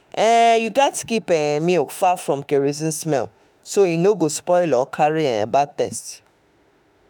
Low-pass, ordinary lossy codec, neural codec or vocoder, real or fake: none; none; autoencoder, 48 kHz, 32 numbers a frame, DAC-VAE, trained on Japanese speech; fake